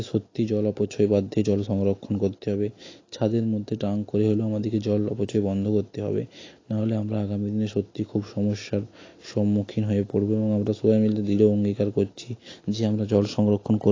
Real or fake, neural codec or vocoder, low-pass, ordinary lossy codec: real; none; 7.2 kHz; AAC, 32 kbps